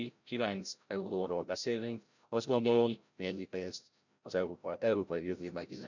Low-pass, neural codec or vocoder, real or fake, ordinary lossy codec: 7.2 kHz; codec, 16 kHz, 0.5 kbps, FreqCodec, larger model; fake; none